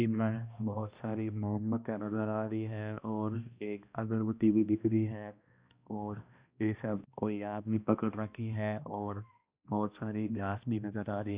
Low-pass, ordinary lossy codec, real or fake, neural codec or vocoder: 3.6 kHz; Opus, 64 kbps; fake; codec, 16 kHz, 1 kbps, X-Codec, HuBERT features, trained on balanced general audio